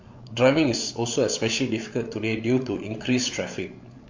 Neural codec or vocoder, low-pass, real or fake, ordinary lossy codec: codec, 16 kHz, 8 kbps, FreqCodec, larger model; 7.2 kHz; fake; MP3, 48 kbps